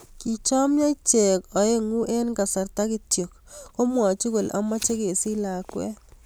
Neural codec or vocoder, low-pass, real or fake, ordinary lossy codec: none; none; real; none